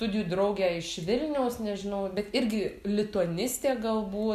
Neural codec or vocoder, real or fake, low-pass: none; real; 14.4 kHz